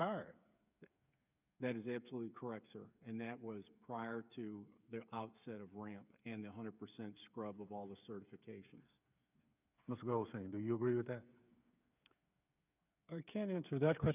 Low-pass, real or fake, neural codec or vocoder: 3.6 kHz; fake; codec, 16 kHz, 8 kbps, FreqCodec, smaller model